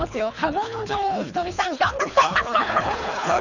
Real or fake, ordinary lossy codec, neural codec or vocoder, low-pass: fake; none; codec, 24 kHz, 3 kbps, HILCodec; 7.2 kHz